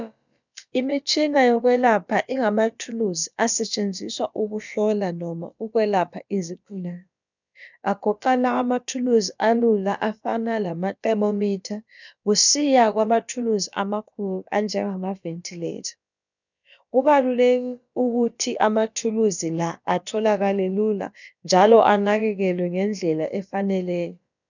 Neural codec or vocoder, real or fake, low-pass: codec, 16 kHz, about 1 kbps, DyCAST, with the encoder's durations; fake; 7.2 kHz